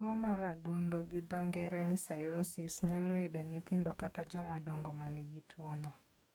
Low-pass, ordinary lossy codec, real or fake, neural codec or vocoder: 19.8 kHz; MP3, 96 kbps; fake; codec, 44.1 kHz, 2.6 kbps, DAC